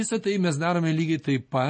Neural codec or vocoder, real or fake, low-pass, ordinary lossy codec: none; real; 9.9 kHz; MP3, 32 kbps